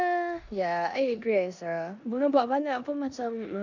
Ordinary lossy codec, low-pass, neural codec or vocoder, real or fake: none; 7.2 kHz; codec, 16 kHz in and 24 kHz out, 0.9 kbps, LongCat-Audio-Codec, four codebook decoder; fake